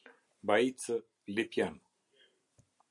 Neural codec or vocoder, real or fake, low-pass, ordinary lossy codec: none; real; 10.8 kHz; MP3, 96 kbps